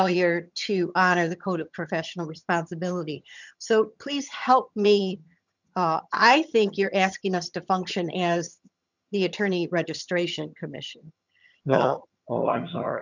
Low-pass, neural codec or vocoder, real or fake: 7.2 kHz; vocoder, 22.05 kHz, 80 mel bands, HiFi-GAN; fake